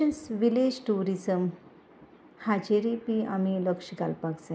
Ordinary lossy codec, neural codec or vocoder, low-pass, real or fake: none; none; none; real